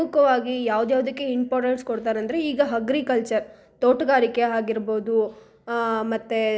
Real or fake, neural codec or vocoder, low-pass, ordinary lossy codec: real; none; none; none